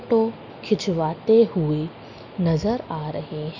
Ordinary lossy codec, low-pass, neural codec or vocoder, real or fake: none; 7.2 kHz; none; real